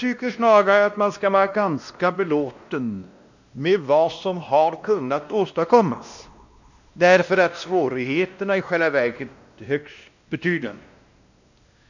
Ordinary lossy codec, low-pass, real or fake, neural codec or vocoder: none; 7.2 kHz; fake; codec, 16 kHz, 1 kbps, X-Codec, WavLM features, trained on Multilingual LibriSpeech